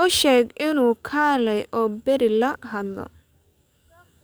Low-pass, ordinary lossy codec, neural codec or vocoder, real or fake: none; none; codec, 44.1 kHz, 7.8 kbps, DAC; fake